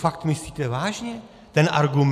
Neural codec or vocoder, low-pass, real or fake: none; 14.4 kHz; real